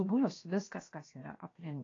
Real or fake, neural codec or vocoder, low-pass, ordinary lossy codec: fake; codec, 16 kHz, 0.7 kbps, FocalCodec; 7.2 kHz; AAC, 32 kbps